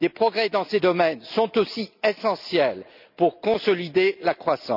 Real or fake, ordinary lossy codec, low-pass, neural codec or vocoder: real; none; 5.4 kHz; none